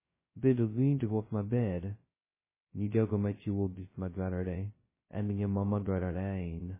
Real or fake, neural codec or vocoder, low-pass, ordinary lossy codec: fake; codec, 16 kHz, 0.2 kbps, FocalCodec; 3.6 kHz; MP3, 16 kbps